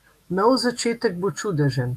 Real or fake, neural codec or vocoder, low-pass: fake; autoencoder, 48 kHz, 128 numbers a frame, DAC-VAE, trained on Japanese speech; 14.4 kHz